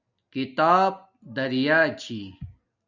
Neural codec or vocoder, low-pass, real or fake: none; 7.2 kHz; real